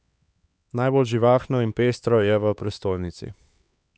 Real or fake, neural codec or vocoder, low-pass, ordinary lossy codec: fake; codec, 16 kHz, 4 kbps, X-Codec, HuBERT features, trained on LibriSpeech; none; none